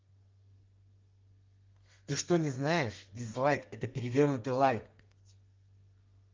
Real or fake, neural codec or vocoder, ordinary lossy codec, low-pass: fake; codec, 32 kHz, 1.9 kbps, SNAC; Opus, 32 kbps; 7.2 kHz